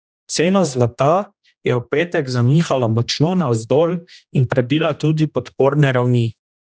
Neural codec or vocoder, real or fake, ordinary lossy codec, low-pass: codec, 16 kHz, 1 kbps, X-Codec, HuBERT features, trained on general audio; fake; none; none